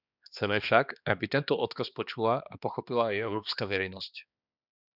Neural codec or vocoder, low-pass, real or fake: codec, 16 kHz, 2 kbps, X-Codec, HuBERT features, trained on balanced general audio; 5.4 kHz; fake